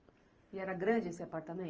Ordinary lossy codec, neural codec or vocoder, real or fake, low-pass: Opus, 24 kbps; vocoder, 44.1 kHz, 128 mel bands every 512 samples, BigVGAN v2; fake; 7.2 kHz